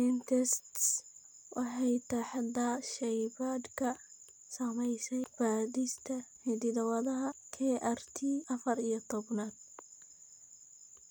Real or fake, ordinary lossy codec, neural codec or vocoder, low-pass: real; none; none; none